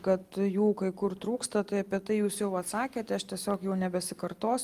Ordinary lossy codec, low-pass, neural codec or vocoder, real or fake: Opus, 32 kbps; 14.4 kHz; none; real